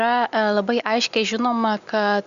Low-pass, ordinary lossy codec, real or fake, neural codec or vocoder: 7.2 kHz; Opus, 64 kbps; real; none